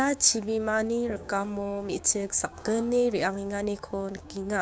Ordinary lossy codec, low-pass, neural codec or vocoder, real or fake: none; none; codec, 16 kHz, 6 kbps, DAC; fake